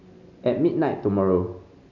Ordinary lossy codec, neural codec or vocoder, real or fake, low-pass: none; none; real; 7.2 kHz